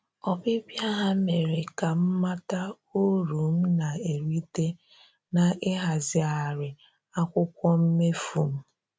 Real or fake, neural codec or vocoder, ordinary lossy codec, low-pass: real; none; none; none